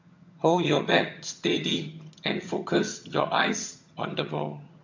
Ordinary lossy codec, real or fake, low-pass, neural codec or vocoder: MP3, 48 kbps; fake; 7.2 kHz; vocoder, 22.05 kHz, 80 mel bands, HiFi-GAN